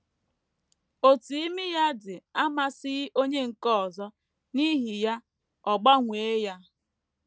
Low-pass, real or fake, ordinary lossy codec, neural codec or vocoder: none; real; none; none